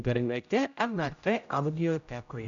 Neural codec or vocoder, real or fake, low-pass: codec, 16 kHz, 0.5 kbps, X-Codec, HuBERT features, trained on general audio; fake; 7.2 kHz